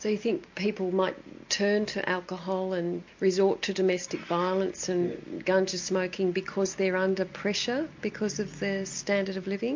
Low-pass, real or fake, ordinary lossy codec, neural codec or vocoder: 7.2 kHz; real; MP3, 48 kbps; none